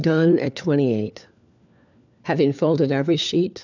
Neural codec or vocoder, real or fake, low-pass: codec, 16 kHz, 4 kbps, FunCodec, trained on LibriTTS, 50 frames a second; fake; 7.2 kHz